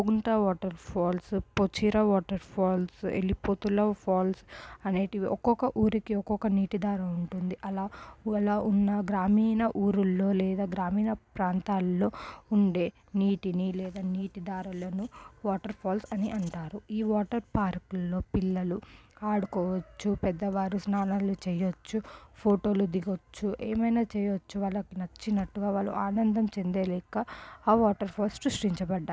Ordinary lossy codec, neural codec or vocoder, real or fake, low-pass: none; none; real; none